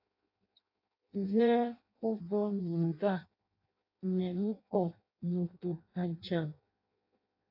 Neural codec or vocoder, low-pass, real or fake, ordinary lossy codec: codec, 16 kHz in and 24 kHz out, 0.6 kbps, FireRedTTS-2 codec; 5.4 kHz; fake; AAC, 48 kbps